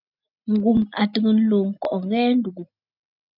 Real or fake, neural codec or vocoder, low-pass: real; none; 5.4 kHz